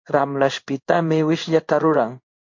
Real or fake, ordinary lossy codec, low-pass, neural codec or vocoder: fake; MP3, 48 kbps; 7.2 kHz; codec, 16 kHz in and 24 kHz out, 1 kbps, XY-Tokenizer